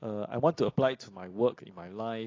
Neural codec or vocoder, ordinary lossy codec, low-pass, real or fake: none; AAC, 32 kbps; 7.2 kHz; real